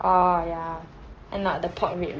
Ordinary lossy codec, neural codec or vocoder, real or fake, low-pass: Opus, 16 kbps; none; real; 7.2 kHz